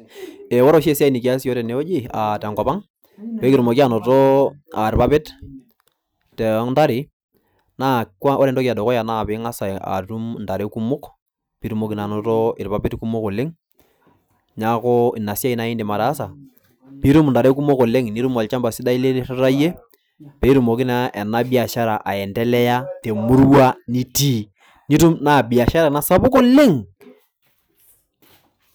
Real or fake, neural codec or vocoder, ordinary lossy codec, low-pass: real; none; none; none